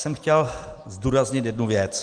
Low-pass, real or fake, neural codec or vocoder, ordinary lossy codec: 9.9 kHz; real; none; Opus, 24 kbps